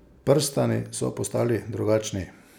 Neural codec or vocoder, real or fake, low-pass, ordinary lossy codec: none; real; none; none